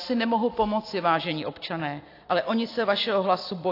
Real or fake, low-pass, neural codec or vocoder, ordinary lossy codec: real; 5.4 kHz; none; AAC, 32 kbps